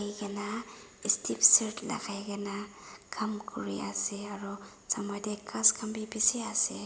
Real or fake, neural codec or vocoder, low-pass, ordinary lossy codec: real; none; none; none